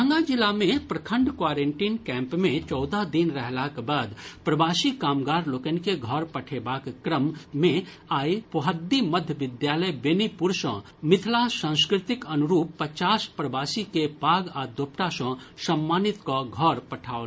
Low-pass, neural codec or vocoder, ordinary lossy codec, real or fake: none; none; none; real